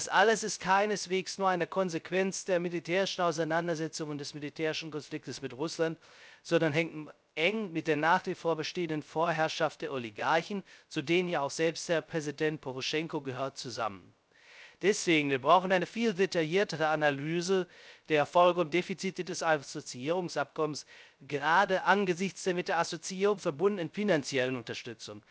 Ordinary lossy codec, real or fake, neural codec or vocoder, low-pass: none; fake; codec, 16 kHz, 0.3 kbps, FocalCodec; none